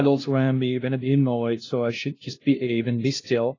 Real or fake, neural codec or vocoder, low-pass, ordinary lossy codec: fake; codec, 16 kHz, 0.5 kbps, FunCodec, trained on LibriTTS, 25 frames a second; 7.2 kHz; AAC, 32 kbps